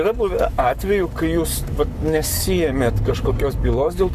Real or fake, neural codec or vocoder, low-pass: fake; codec, 44.1 kHz, 7.8 kbps, Pupu-Codec; 14.4 kHz